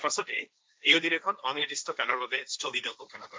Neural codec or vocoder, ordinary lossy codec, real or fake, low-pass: codec, 16 kHz, 1.1 kbps, Voila-Tokenizer; none; fake; none